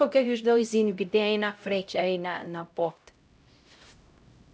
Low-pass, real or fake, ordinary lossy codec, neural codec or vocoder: none; fake; none; codec, 16 kHz, 0.5 kbps, X-Codec, HuBERT features, trained on LibriSpeech